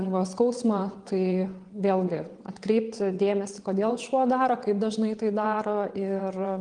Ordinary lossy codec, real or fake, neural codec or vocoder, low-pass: Opus, 24 kbps; fake; vocoder, 22.05 kHz, 80 mel bands, WaveNeXt; 9.9 kHz